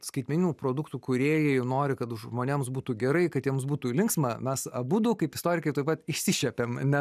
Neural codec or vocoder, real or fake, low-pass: none; real; 14.4 kHz